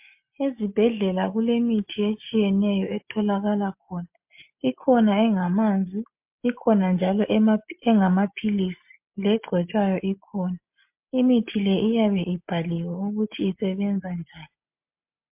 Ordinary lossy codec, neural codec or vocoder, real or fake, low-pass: MP3, 32 kbps; none; real; 3.6 kHz